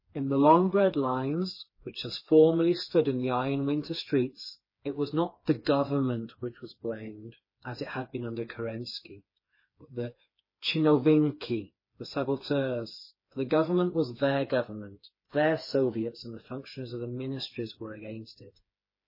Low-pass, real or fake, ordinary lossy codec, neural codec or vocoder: 5.4 kHz; fake; MP3, 24 kbps; codec, 16 kHz, 4 kbps, FreqCodec, smaller model